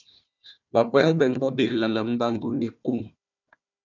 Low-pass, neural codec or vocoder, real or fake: 7.2 kHz; codec, 16 kHz, 1 kbps, FunCodec, trained on Chinese and English, 50 frames a second; fake